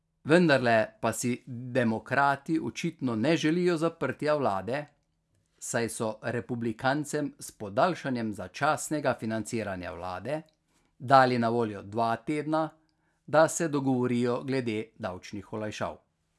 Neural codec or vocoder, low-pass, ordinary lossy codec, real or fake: none; none; none; real